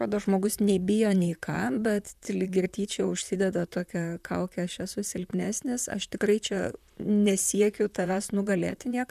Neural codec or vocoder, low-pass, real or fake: vocoder, 44.1 kHz, 128 mel bands, Pupu-Vocoder; 14.4 kHz; fake